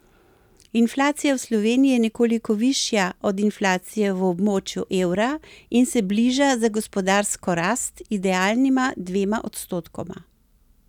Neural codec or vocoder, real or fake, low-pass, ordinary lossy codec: none; real; 19.8 kHz; none